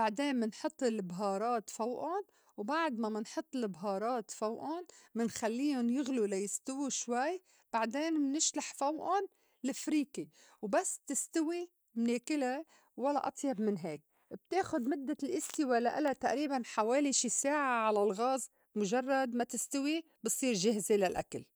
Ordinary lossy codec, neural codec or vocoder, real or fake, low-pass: none; none; real; none